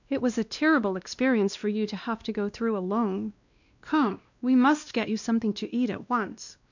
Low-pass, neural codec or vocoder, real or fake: 7.2 kHz; codec, 16 kHz, 1 kbps, X-Codec, WavLM features, trained on Multilingual LibriSpeech; fake